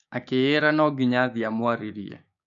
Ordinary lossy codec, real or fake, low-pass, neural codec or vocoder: none; fake; 7.2 kHz; codec, 16 kHz, 6 kbps, DAC